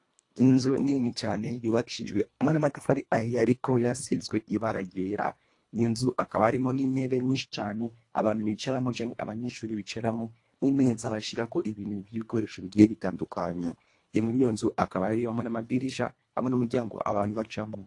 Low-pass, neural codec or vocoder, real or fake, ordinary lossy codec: 10.8 kHz; codec, 24 kHz, 1.5 kbps, HILCodec; fake; AAC, 48 kbps